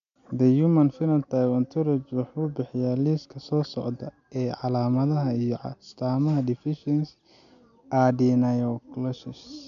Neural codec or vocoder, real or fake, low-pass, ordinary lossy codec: none; real; 7.2 kHz; none